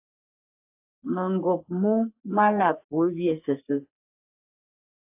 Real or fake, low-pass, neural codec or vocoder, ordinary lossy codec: fake; 3.6 kHz; codec, 44.1 kHz, 3.4 kbps, Pupu-Codec; AAC, 32 kbps